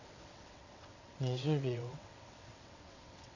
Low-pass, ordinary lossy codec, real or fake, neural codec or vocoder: 7.2 kHz; none; fake; vocoder, 22.05 kHz, 80 mel bands, WaveNeXt